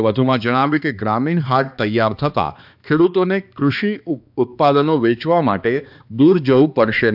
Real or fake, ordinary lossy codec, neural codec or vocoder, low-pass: fake; AAC, 48 kbps; codec, 16 kHz, 2 kbps, X-Codec, HuBERT features, trained on balanced general audio; 5.4 kHz